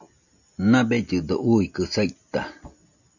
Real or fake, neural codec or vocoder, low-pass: real; none; 7.2 kHz